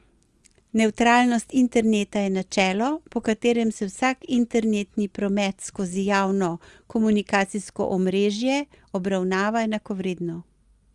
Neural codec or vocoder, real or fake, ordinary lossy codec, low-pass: none; real; Opus, 32 kbps; 10.8 kHz